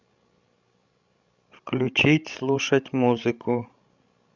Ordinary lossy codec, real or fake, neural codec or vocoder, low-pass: Opus, 64 kbps; fake; codec, 16 kHz, 16 kbps, FreqCodec, larger model; 7.2 kHz